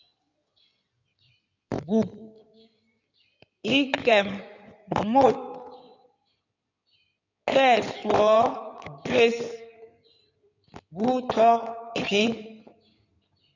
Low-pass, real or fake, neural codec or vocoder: 7.2 kHz; fake; codec, 16 kHz in and 24 kHz out, 2.2 kbps, FireRedTTS-2 codec